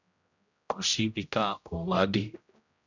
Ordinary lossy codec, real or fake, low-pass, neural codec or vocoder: none; fake; 7.2 kHz; codec, 16 kHz, 0.5 kbps, X-Codec, HuBERT features, trained on general audio